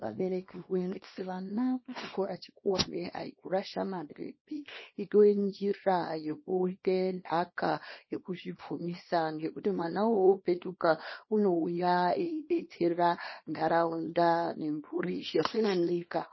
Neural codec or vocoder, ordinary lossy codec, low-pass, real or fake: codec, 24 kHz, 0.9 kbps, WavTokenizer, small release; MP3, 24 kbps; 7.2 kHz; fake